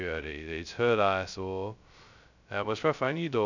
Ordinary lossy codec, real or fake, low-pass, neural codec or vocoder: none; fake; 7.2 kHz; codec, 16 kHz, 0.2 kbps, FocalCodec